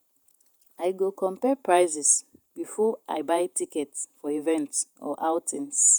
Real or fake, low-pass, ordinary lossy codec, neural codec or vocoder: fake; none; none; vocoder, 48 kHz, 128 mel bands, Vocos